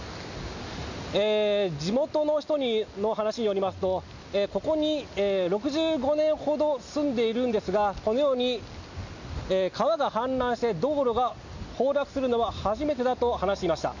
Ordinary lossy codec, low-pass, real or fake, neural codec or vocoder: none; 7.2 kHz; real; none